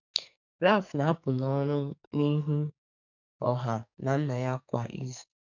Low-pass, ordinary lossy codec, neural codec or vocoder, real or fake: 7.2 kHz; none; codec, 44.1 kHz, 2.6 kbps, SNAC; fake